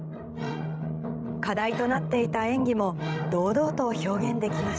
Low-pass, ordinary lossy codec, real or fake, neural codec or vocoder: none; none; fake; codec, 16 kHz, 16 kbps, FreqCodec, larger model